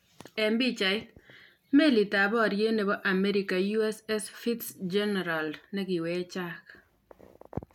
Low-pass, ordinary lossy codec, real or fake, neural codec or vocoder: 19.8 kHz; none; real; none